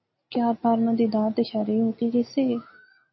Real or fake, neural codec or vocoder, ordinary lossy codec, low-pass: real; none; MP3, 24 kbps; 7.2 kHz